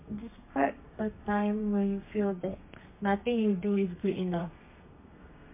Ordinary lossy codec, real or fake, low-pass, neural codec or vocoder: MP3, 24 kbps; fake; 3.6 kHz; codec, 32 kHz, 1.9 kbps, SNAC